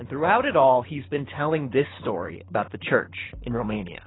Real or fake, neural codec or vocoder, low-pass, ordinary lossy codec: fake; codec, 24 kHz, 6 kbps, HILCodec; 7.2 kHz; AAC, 16 kbps